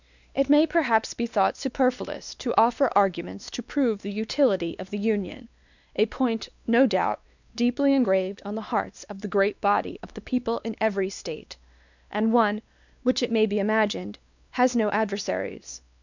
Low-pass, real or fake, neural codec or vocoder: 7.2 kHz; fake; codec, 16 kHz, 2 kbps, X-Codec, WavLM features, trained on Multilingual LibriSpeech